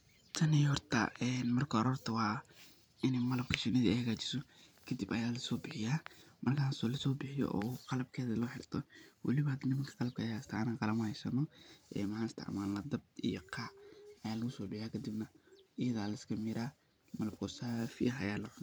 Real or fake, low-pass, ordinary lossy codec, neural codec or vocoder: fake; none; none; vocoder, 44.1 kHz, 128 mel bands every 512 samples, BigVGAN v2